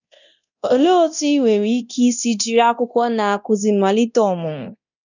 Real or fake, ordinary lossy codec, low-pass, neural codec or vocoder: fake; none; 7.2 kHz; codec, 24 kHz, 0.9 kbps, DualCodec